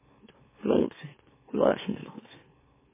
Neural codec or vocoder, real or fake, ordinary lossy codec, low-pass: autoencoder, 44.1 kHz, a latent of 192 numbers a frame, MeloTTS; fake; MP3, 16 kbps; 3.6 kHz